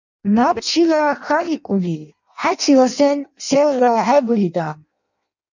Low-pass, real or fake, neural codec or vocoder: 7.2 kHz; fake; codec, 16 kHz in and 24 kHz out, 0.6 kbps, FireRedTTS-2 codec